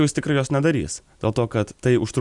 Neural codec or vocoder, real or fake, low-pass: none; real; 10.8 kHz